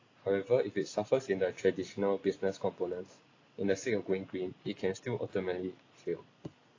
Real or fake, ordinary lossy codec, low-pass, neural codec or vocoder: real; AAC, 32 kbps; 7.2 kHz; none